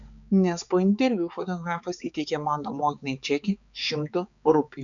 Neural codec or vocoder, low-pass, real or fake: codec, 16 kHz, 4 kbps, X-Codec, HuBERT features, trained on balanced general audio; 7.2 kHz; fake